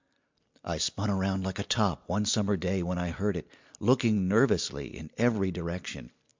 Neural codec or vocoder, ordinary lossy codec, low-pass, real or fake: none; MP3, 64 kbps; 7.2 kHz; real